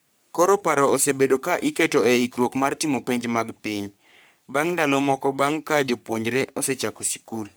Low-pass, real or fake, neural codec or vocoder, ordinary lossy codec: none; fake; codec, 44.1 kHz, 3.4 kbps, Pupu-Codec; none